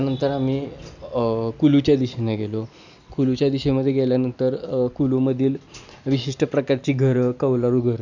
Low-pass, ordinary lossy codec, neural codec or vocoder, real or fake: 7.2 kHz; none; none; real